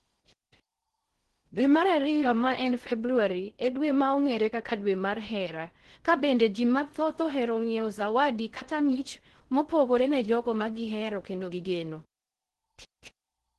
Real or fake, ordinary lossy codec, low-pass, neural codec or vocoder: fake; Opus, 16 kbps; 10.8 kHz; codec, 16 kHz in and 24 kHz out, 0.6 kbps, FocalCodec, streaming, 4096 codes